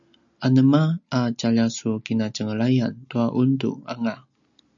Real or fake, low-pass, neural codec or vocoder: real; 7.2 kHz; none